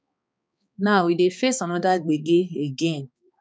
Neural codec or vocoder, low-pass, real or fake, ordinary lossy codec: codec, 16 kHz, 4 kbps, X-Codec, HuBERT features, trained on balanced general audio; none; fake; none